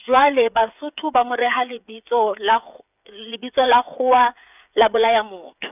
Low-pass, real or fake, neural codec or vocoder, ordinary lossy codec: 3.6 kHz; fake; codec, 16 kHz, 8 kbps, FreqCodec, smaller model; none